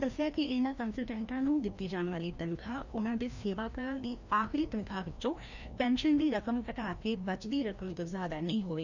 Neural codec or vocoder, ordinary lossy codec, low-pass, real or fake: codec, 16 kHz, 1 kbps, FreqCodec, larger model; none; 7.2 kHz; fake